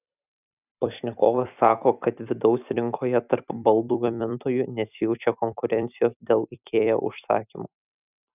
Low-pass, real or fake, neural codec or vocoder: 3.6 kHz; fake; vocoder, 44.1 kHz, 128 mel bands every 256 samples, BigVGAN v2